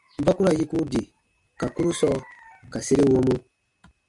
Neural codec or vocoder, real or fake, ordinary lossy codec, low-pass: none; real; MP3, 64 kbps; 10.8 kHz